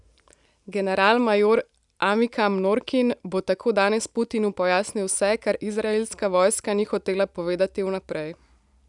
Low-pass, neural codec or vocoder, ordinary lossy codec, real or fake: 10.8 kHz; none; none; real